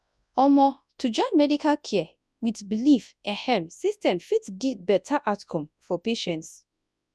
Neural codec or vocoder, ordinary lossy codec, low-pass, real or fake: codec, 24 kHz, 0.9 kbps, WavTokenizer, large speech release; none; none; fake